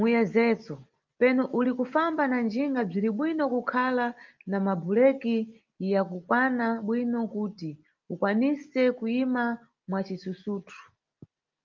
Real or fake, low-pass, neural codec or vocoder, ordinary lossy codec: real; 7.2 kHz; none; Opus, 32 kbps